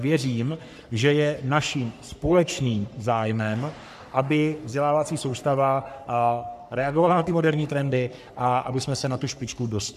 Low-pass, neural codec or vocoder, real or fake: 14.4 kHz; codec, 44.1 kHz, 3.4 kbps, Pupu-Codec; fake